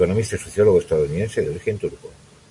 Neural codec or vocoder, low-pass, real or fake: none; 10.8 kHz; real